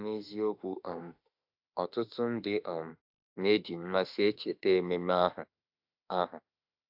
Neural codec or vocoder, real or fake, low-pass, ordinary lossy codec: autoencoder, 48 kHz, 32 numbers a frame, DAC-VAE, trained on Japanese speech; fake; 5.4 kHz; AAC, 48 kbps